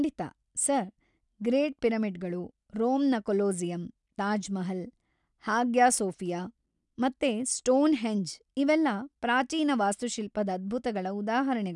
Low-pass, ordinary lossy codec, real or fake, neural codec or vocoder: 10.8 kHz; none; real; none